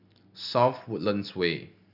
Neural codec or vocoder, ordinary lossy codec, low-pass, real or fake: none; none; 5.4 kHz; real